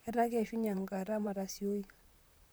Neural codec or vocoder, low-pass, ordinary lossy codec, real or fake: vocoder, 44.1 kHz, 128 mel bands, Pupu-Vocoder; none; none; fake